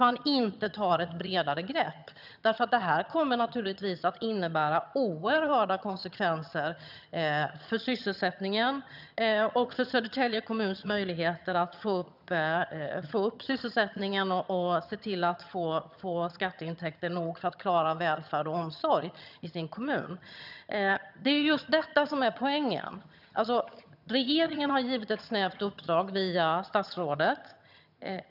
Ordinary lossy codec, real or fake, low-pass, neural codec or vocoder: none; fake; 5.4 kHz; vocoder, 22.05 kHz, 80 mel bands, HiFi-GAN